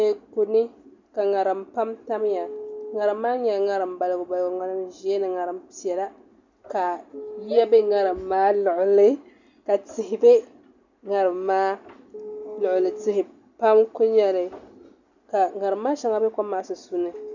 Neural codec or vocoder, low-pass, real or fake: none; 7.2 kHz; real